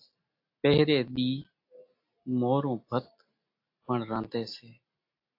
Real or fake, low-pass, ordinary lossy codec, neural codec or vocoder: real; 5.4 kHz; AAC, 32 kbps; none